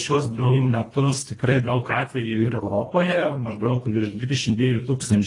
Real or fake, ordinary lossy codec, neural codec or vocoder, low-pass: fake; AAC, 32 kbps; codec, 24 kHz, 1.5 kbps, HILCodec; 10.8 kHz